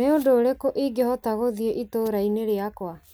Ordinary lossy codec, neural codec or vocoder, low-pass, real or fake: none; none; none; real